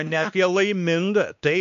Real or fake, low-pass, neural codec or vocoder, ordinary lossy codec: fake; 7.2 kHz; codec, 16 kHz, 2 kbps, X-Codec, WavLM features, trained on Multilingual LibriSpeech; MP3, 64 kbps